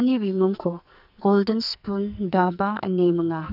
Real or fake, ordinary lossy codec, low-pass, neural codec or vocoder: fake; none; 5.4 kHz; codec, 44.1 kHz, 2.6 kbps, SNAC